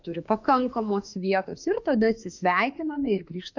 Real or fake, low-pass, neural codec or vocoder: fake; 7.2 kHz; autoencoder, 48 kHz, 32 numbers a frame, DAC-VAE, trained on Japanese speech